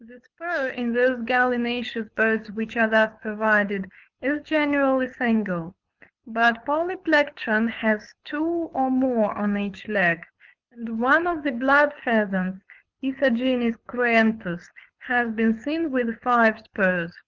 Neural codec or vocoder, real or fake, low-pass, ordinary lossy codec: codec, 24 kHz, 6 kbps, HILCodec; fake; 7.2 kHz; Opus, 24 kbps